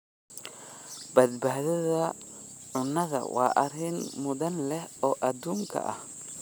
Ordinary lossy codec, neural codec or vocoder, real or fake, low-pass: none; none; real; none